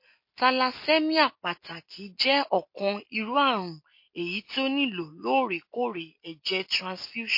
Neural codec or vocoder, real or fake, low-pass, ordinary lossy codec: none; real; 5.4 kHz; MP3, 32 kbps